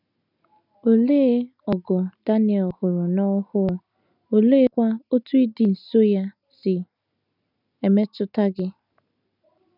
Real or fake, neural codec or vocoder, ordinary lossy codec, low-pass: real; none; none; 5.4 kHz